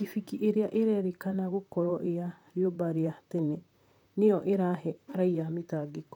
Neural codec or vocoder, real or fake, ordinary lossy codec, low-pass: vocoder, 44.1 kHz, 128 mel bands, Pupu-Vocoder; fake; none; 19.8 kHz